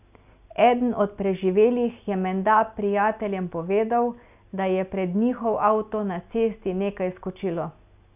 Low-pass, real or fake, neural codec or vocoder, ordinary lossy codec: 3.6 kHz; real; none; none